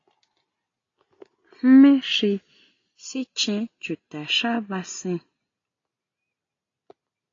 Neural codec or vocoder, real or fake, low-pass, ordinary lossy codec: none; real; 7.2 kHz; AAC, 32 kbps